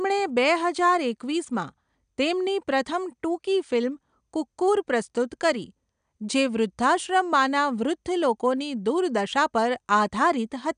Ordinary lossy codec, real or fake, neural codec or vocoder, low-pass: none; real; none; 9.9 kHz